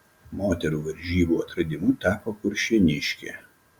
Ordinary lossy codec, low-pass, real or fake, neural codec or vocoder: Opus, 64 kbps; 19.8 kHz; real; none